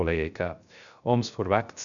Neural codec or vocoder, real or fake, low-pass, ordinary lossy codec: codec, 16 kHz, 0.3 kbps, FocalCodec; fake; 7.2 kHz; none